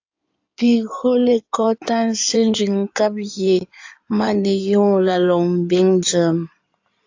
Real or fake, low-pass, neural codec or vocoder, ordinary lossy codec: fake; 7.2 kHz; codec, 16 kHz in and 24 kHz out, 2.2 kbps, FireRedTTS-2 codec; AAC, 48 kbps